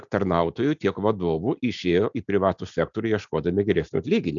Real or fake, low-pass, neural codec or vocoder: real; 7.2 kHz; none